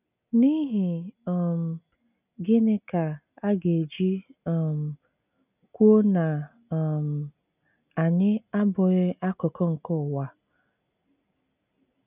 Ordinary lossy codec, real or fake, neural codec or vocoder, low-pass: none; real; none; 3.6 kHz